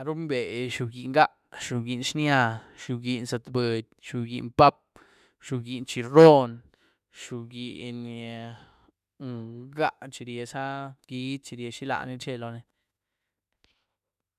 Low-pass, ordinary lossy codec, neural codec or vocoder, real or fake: 14.4 kHz; none; autoencoder, 48 kHz, 32 numbers a frame, DAC-VAE, trained on Japanese speech; fake